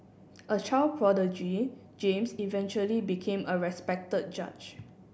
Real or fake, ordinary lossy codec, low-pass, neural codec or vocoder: real; none; none; none